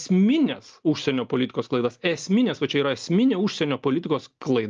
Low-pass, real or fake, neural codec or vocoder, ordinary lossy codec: 7.2 kHz; real; none; Opus, 24 kbps